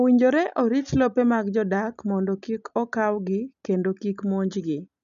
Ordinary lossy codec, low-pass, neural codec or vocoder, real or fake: none; 7.2 kHz; none; real